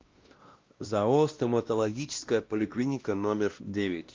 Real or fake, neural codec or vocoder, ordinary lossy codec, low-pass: fake; codec, 16 kHz, 1 kbps, X-Codec, WavLM features, trained on Multilingual LibriSpeech; Opus, 32 kbps; 7.2 kHz